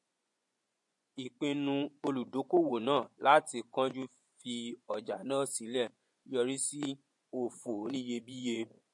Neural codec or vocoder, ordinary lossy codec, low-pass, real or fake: vocoder, 24 kHz, 100 mel bands, Vocos; MP3, 48 kbps; 10.8 kHz; fake